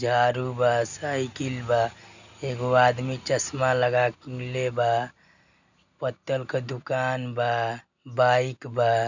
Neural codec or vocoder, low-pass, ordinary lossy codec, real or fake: none; 7.2 kHz; none; real